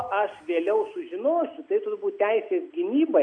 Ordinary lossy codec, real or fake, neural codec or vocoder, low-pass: AAC, 64 kbps; real; none; 9.9 kHz